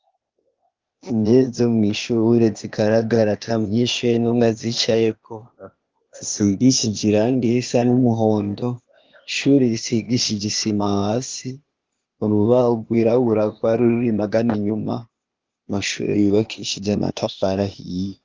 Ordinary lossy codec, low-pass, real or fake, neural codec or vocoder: Opus, 24 kbps; 7.2 kHz; fake; codec, 16 kHz, 0.8 kbps, ZipCodec